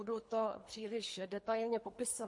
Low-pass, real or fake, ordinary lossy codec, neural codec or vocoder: 10.8 kHz; fake; MP3, 48 kbps; codec, 24 kHz, 3 kbps, HILCodec